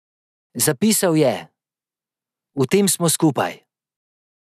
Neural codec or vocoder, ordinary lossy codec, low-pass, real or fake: none; none; 14.4 kHz; real